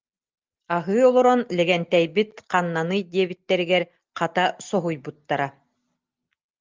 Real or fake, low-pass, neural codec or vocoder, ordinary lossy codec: real; 7.2 kHz; none; Opus, 32 kbps